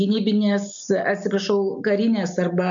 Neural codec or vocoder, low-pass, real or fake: none; 7.2 kHz; real